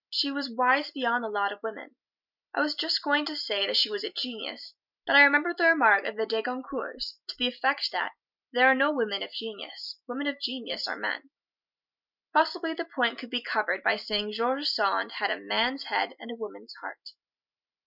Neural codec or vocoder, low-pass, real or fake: none; 5.4 kHz; real